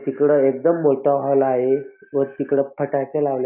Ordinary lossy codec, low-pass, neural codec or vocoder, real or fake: none; 3.6 kHz; none; real